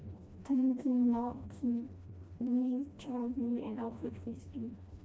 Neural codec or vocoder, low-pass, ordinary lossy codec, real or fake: codec, 16 kHz, 1 kbps, FreqCodec, smaller model; none; none; fake